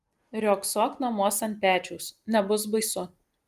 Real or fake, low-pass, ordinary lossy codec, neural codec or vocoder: real; 14.4 kHz; Opus, 32 kbps; none